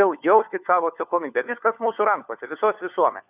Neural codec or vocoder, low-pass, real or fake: codec, 16 kHz, 4 kbps, FunCodec, trained on LibriTTS, 50 frames a second; 3.6 kHz; fake